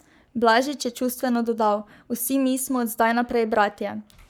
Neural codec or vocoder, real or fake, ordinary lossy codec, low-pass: codec, 44.1 kHz, 7.8 kbps, Pupu-Codec; fake; none; none